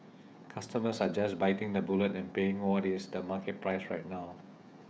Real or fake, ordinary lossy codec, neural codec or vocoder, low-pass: fake; none; codec, 16 kHz, 16 kbps, FreqCodec, smaller model; none